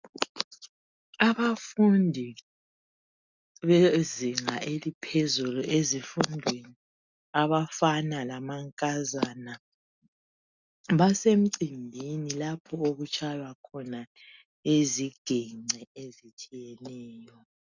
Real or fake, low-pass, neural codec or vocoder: real; 7.2 kHz; none